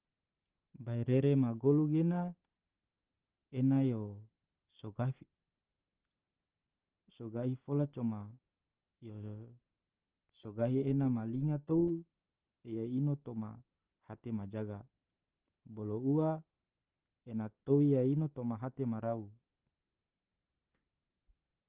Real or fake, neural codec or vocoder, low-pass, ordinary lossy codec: real; none; 3.6 kHz; Opus, 16 kbps